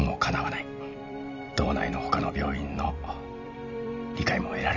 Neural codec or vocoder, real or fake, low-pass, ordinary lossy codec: vocoder, 44.1 kHz, 128 mel bands every 256 samples, BigVGAN v2; fake; 7.2 kHz; none